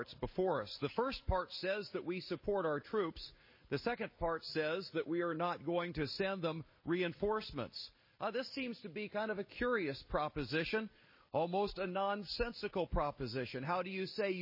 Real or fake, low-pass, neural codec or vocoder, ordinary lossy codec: real; 5.4 kHz; none; MP3, 24 kbps